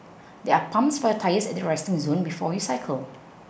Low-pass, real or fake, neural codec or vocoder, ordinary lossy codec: none; real; none; none